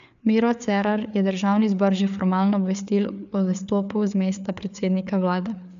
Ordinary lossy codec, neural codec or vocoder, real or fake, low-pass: none; codec, 16 kHz, 4 kbps, FreqCodec, larger model; fake; 7.2 kHz